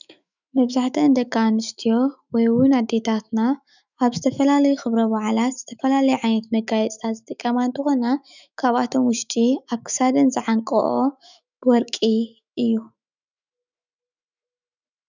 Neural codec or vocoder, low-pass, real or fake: autoencoder, 48 kHz, 128 numbers a frame, DAC-VAE, trained on Japanese speech; 7.2 kHz; fake